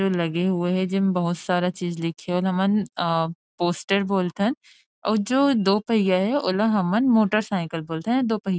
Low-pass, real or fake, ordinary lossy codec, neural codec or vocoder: none; real; none; none